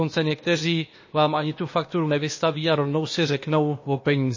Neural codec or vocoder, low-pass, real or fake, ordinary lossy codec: codec, 16 kHz, 0.8 kbps, ZipCodec; 7.2 kHz; fake; MP3, 32 kbps